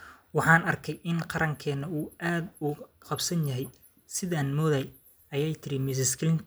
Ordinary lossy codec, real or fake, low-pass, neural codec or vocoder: none; real; none; none